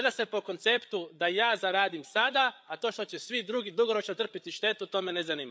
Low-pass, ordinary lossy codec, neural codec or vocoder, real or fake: none; none; codec, 16 kHz, 8 kbps, FreqCodec, larger model; fake